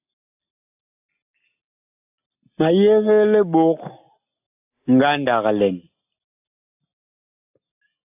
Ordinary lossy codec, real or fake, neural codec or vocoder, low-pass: AAC, 32 kbps; real; none; 3.6 kHz